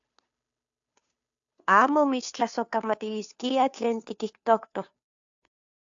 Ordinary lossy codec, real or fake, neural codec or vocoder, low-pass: AAC, 64 kbps; fake; codec, 16 kHz, 2 kbps, FunCodec, trained on Chinese and English, 25 frames a second; 7.2 kHz